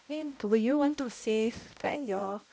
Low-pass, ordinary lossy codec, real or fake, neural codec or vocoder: none; none; fake; codec, 16 kHz, 0.5 kbps, X-Codec, HuBERT features, trained on balanced general audio